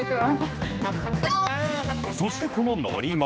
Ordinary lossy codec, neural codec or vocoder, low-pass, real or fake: none; codec, 16 kHz, 2 kbps, X-Codec, HuBERT features, trained on general audio; none; fake